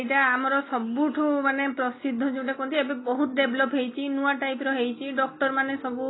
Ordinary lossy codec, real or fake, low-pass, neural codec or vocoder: AAC, 16 kbps; real; 7.2 kHz; none